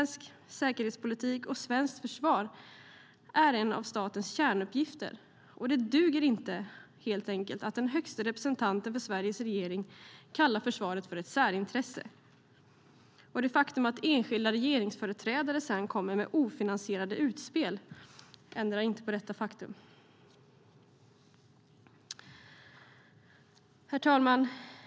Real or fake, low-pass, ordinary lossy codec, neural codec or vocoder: real; none; none; none